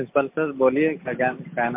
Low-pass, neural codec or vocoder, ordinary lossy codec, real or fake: 3.6 kHz; none; MP3, 32 kbps; real